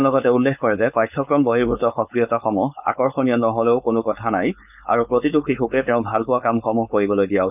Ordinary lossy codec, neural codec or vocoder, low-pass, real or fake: none; codec, 16 kHz, 4.8 kbps, FACodec; 3.6 kHz; fake